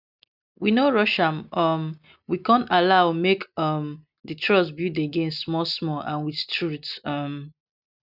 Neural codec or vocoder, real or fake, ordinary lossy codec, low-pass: none; real; none; 5.4 kHz